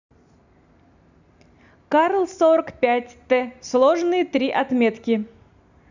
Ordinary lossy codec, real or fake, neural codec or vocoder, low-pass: none; real; none; 7.2 kHz